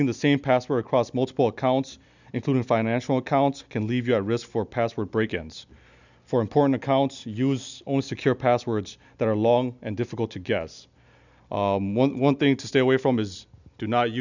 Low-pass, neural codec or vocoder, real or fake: 7.2 kHz; none; real